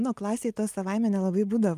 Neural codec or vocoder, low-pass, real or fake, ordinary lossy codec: none; 14.4 kHz; real; Opus, 32 kbps